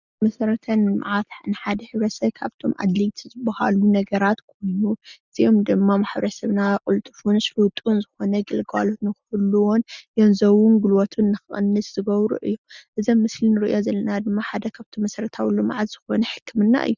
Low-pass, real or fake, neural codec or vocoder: 7.2 kHz; real; none